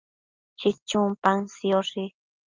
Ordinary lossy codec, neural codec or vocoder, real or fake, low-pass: Opus, 24 kbps; none; real; 7.2 kHz